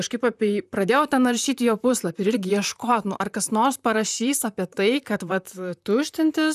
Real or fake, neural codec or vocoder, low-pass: fake; vocoder, 44.1 kHz, 128 mel bands, Pupu-Vocoder; 14.4 kHz